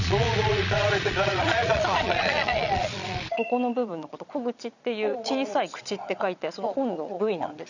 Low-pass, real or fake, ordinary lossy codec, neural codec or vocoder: 7.2 kHz; fake; none; vocoder, 22.05 kHz, 80 mel bands, Vocos